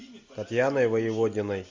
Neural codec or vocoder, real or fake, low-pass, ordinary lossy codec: none; real; 7.2 kHz; MP3, 64 kbps